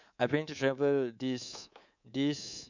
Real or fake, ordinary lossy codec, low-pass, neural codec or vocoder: fake; none; 7.2 kHz; codec, 24 kHz, 3.1 kbps, DualCodec